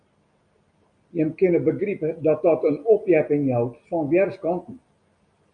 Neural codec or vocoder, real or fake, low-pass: none; real; 9.9 kHz